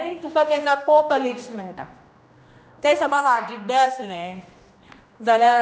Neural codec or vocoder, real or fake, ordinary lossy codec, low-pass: codec, 16 kHz, 1 kbps, X-Codec, HuBERT features, trained on general audio; fake; none; none